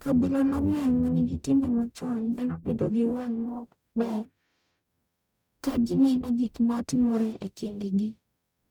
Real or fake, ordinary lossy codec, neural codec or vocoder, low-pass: fake; none; codec, 44.1 kHz, 0.9 kbps, DAC; 19.8 kHz